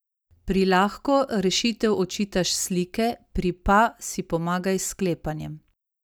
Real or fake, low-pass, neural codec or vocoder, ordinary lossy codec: real; none; none; none